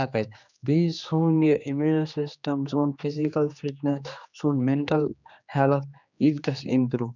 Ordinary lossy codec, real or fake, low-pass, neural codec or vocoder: none; fake; 7.2 kHz; codec, 16 kHz, 2 kbps, X-Codec, HuBERT features, trained on general audio